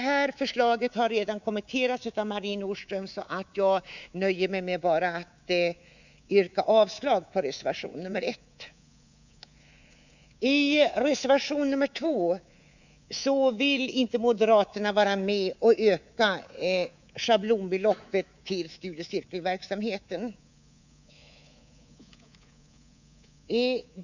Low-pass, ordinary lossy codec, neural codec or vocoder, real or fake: 7.2 kHz; none; codec, 24 kHz, 3.1 kbps, DualCodec; fake